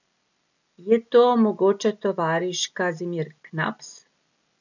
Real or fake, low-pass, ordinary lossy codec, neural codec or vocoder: real; 7.2 kHz; none; none